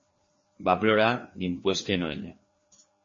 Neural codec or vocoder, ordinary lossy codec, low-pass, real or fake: codec, 16 kHz, 2 kbps, FreqCodec, larger model; MP3, 32 kbps; 7.2 kHz; fake